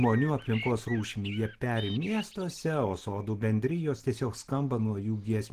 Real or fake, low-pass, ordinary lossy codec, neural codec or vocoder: real; 14.4 kHz; Opus, 16 kbps; none